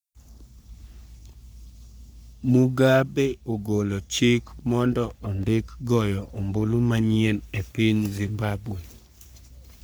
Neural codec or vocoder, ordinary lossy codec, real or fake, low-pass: codec, 44.1 kHz, 3.4 kbps, Pupu-Codec; none; fake; none